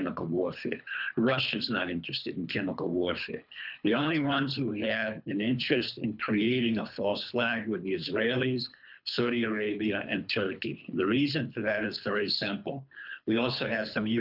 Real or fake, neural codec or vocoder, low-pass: fake; codec, 24 kHz, 3 kbps, HILCodec; 5.4 kHz